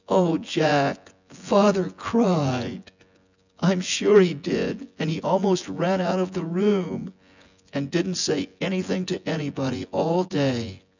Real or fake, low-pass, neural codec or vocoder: fake; 7.2 kHz; vocoder, 24 kHz, 100 mel bands, Vocos